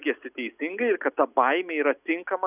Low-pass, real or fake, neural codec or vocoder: 3.6 kHz; real; none